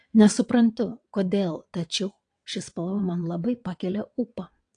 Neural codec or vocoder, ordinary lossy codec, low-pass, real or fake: vocoder, 22.05 kHz, 80 mel bands, WaveNeXt; AAC, 48 kbps; 9.9 kHz; fake